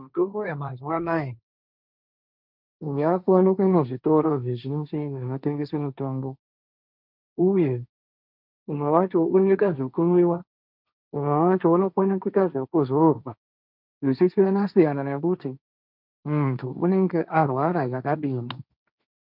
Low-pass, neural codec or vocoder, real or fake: 5.4 kHz; codec, 16 kHz, 1.1 kbps, Voila-Tokenizer; fake